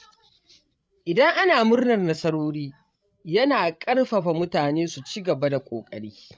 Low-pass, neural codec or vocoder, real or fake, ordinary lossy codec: none; codec, 16 kHz, 8 kbps, FreqCodec, larger model; fake; none